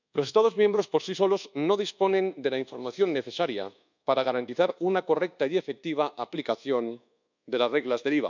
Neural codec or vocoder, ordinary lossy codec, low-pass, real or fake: codec, 24 kHz, 1.2 kbps, DualCodec; none; 7.2 kHz; fake